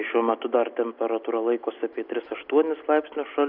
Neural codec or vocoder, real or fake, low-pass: none; real; 5.4 kHz